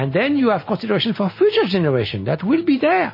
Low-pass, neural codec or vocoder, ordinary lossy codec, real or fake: 5.4 kHz; none; MP3, 24 kbps; real